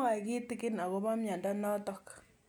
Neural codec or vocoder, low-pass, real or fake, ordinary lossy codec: none; none; real; none